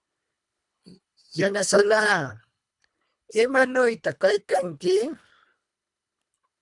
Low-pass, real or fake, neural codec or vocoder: 10.8 kHz; fake; codec, 24 kHz, 1.5 kbps, HILCodec